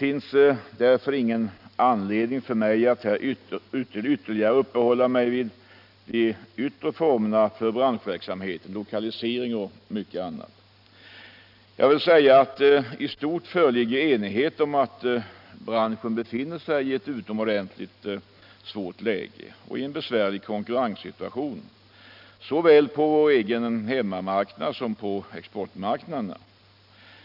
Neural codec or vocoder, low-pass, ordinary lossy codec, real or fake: none; 5.4 kHz; none; real